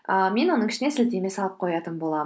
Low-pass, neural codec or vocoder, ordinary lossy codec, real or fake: none; none; none; real